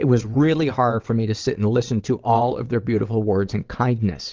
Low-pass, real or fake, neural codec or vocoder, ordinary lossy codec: 7.2 kHz; fake; vocoder, 22.05 kHz, 80 mel bands, WaveNeXt; Opus, 24 kbps